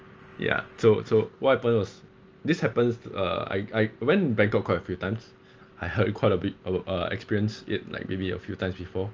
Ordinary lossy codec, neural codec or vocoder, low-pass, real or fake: Opus, 24 kbps; none; 7.2 kHz; real